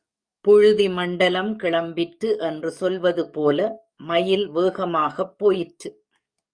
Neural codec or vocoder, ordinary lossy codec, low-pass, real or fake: codec, 44.1 kHz, 7.8 kbps, DAC; Opus, 64 kbps; 9.9 kHz; fake